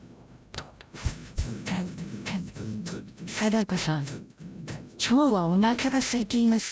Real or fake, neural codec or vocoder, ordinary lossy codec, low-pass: fake; codec, 16 kHz, 0.5 kbps, FreqCodec, larger model; none; none